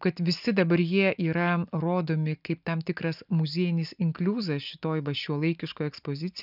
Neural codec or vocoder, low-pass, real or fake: none; 5.4 kHz; real